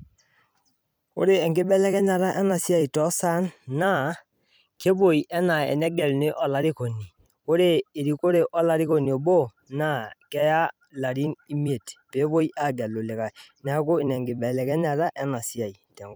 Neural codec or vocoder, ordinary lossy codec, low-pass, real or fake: vocoder, 44.1 kHz, 128 mel bands every 256 samples, BigVGAN v2; none; none; fake